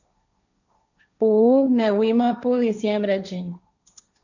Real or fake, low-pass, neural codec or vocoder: fake; 7.2 kHz; codec, 16 kHz, 1.1 kbps, Voila-Tokenizer